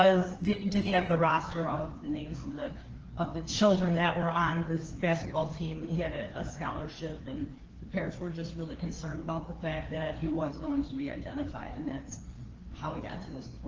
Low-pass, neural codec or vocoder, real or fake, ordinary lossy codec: 7.2 kHz; codec, 16 kHz, 2 kbps, FreqCodec, larger model; fake; Opus, 16 kbps